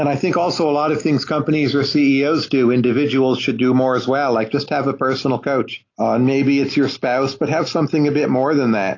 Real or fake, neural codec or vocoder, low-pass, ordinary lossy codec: real; none; 7.2 kHz; AAC, 32 kbps